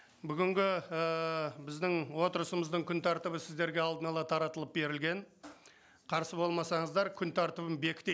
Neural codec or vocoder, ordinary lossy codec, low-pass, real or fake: none; none; none; real